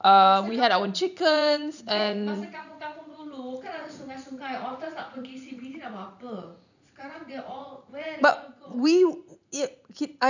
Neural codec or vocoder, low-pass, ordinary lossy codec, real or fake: vocoder, 44.1 kHz, 80 mel bands, Vocos; 7.2 kHz; none; fake